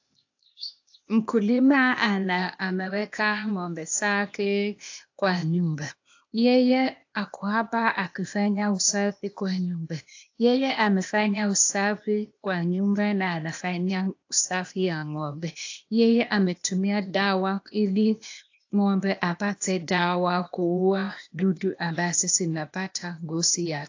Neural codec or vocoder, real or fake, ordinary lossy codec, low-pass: codec, 16 kHz, 0.8 kbps, ZipCodec; fake; AAC, 48 kbps; 7.2 kHz